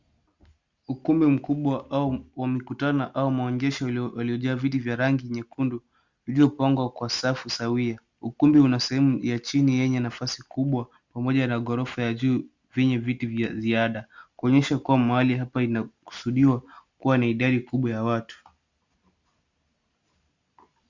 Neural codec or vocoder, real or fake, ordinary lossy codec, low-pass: none; real; Opus, 64 kbps; 7.2 kHz